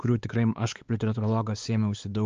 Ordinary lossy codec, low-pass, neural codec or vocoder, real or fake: Opus, 24 kbps; 7.2 kHz; codec, 16 kHz, 4 kbps, X-Codec, WavLM features, trained on Multilingual LibriSpeech; fake